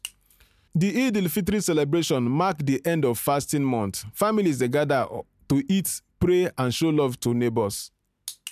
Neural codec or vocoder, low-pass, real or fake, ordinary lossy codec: none; 14.4 kHz; real; none